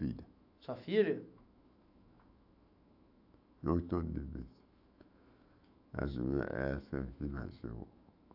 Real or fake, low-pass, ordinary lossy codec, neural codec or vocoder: fake; 5.4 kHz; AAC, 48 kbps; autoencoder, 48 kHz, 128 numbers a frame, DAC-VAE, trained on Japanese speech